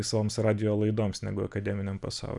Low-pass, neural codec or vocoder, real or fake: 10.8 kHz; none; real